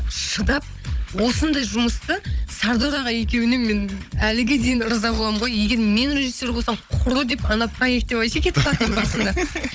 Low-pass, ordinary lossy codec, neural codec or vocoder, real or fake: none; none; codec, 16 kHz, 16 kbps, FunCodec, trained on Chinese and English, 50 frames a second; fake